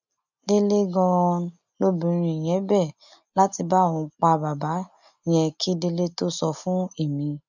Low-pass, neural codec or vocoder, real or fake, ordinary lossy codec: 7.2 kHz; none; real; none